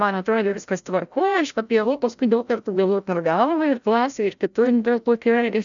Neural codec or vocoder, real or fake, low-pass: codec, 16 kHz, 0.5 kbps, FreqCodec, larger model; fake; 7.2 kHz